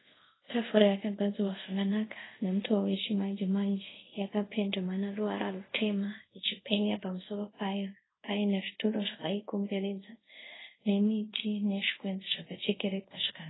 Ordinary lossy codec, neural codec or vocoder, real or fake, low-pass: AAC, 16 kbps; codec, 24 kHz, 0.5 kbps, DualCodec; fake; 7.2 kHz